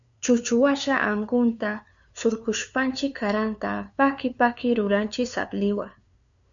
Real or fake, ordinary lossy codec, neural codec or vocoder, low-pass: fake; MP3, 96 kbps; codec, 16 kHz, 2 kbps, FunCodec, trained on LibriTTS, 25 frames a second; 7.2 kHz